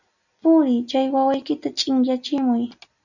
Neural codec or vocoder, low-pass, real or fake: none; 7.2 kHz; real